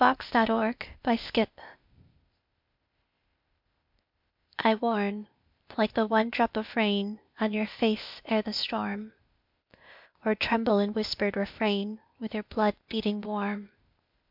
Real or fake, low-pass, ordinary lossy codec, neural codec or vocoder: fake; 5.4 kHz; MP3, 48 kbps; codec, 16 kHz, 0.8 kbps, ZipCodec